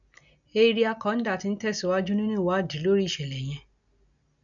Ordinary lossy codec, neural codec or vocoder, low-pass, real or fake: none; none; 7.2 kHz; real